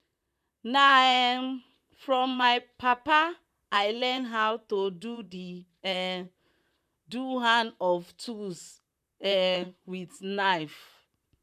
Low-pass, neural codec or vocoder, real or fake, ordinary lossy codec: 14.4 kHz; vocoder, 44.1 kHz, 128 mel bands, Pupu-Vocoder; fake; none